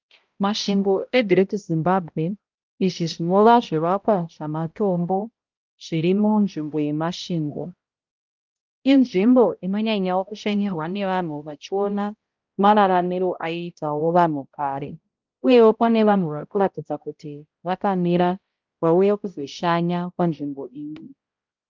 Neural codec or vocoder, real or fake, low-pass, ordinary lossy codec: codec, 16 kHz, 0.5 kbps, X-Codec, HuBERT features, trained on balanced general audio; fake; 7.2 kHz; Opus, 24 kbps